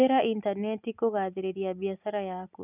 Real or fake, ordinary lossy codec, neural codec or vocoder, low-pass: real; none; none; 3.6 kHz